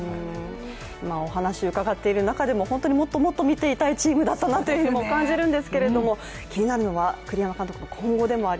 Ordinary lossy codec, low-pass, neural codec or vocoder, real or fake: none; none; none; real